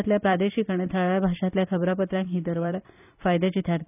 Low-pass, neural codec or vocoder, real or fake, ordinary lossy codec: 3.6 kHz; vocoder, 44.1 kHz, 128 mel bands every 256 samples, BigVGAN v2; fake; none